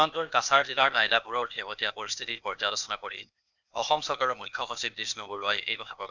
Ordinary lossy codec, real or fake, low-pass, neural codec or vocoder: none; fake; 7.2 kHz; codec, 16 kHz, 0.8 kbps, ZipCodec